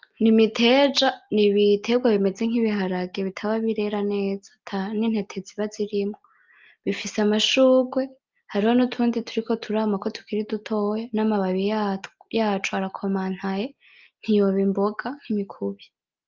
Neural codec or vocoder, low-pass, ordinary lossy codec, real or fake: none; 7.2 kHz; Opus, 32 kbps; real